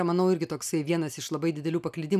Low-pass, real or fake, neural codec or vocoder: 14.4 kHz; real; none